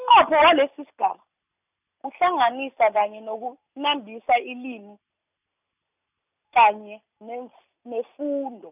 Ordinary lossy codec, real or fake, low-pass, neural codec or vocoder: none; real; 3.6 kHz; none